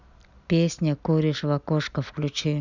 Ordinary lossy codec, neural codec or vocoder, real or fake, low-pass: none; none; real; 7.2 kHz